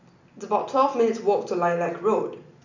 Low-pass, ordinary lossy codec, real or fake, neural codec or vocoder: 7.2 kHz; none; real; none